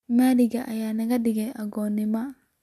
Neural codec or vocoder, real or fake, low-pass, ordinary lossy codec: none; real; 14.4 kHz; MP3, 96 kbps